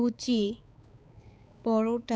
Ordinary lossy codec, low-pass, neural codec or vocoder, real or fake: none; none; codec, 16 kHz, 4 kbps, X-Codec, HuBERT features, trained on balanced general audio; fake